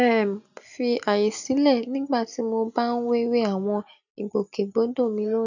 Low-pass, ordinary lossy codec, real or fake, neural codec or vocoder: 7.2 kHz; none; real; none